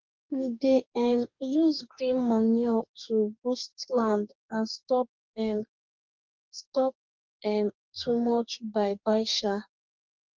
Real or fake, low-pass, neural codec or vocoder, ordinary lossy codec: fake; 7.2 kHz; codec, 44.1 kHz, 2.6 kbps, DAC; Opus, 32 kbps